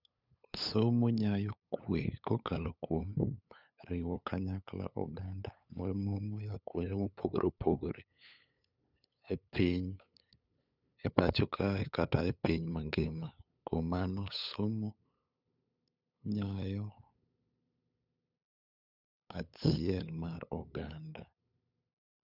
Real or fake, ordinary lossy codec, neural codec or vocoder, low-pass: fake; none; codec, 16 kHz, 8 kbps, FunCodec, trained on LibriTTS, 25 frames a second; 5.4 kHz